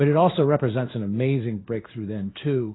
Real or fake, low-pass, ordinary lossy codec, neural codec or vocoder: fake; 7.2 kHz; AAC, 16 kbps; codec, 16 kHz in and 24 kHz out, 1 kbps, XY-Tokenizer